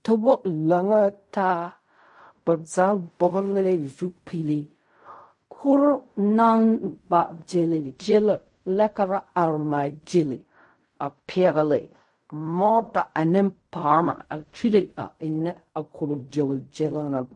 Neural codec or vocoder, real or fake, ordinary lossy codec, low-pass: codec, 16 kHz in and 24 kHz out, 0.4 kbps, LongCat-Audio-Codec, fine tuned four codebook decoder; fake; MP3, 48 kbps; 10.8 kHz